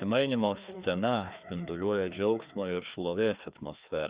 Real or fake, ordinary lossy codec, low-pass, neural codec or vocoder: fake; Opus, 64 kbps; 3.6 kHz; codec, 16 kHz, 2 kbps, FreqCodec, larger model